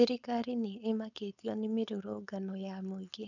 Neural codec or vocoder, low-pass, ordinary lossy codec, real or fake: codec, 16 kHz, 4.8 kbps, FACodec; 7.2 kHz; none; fake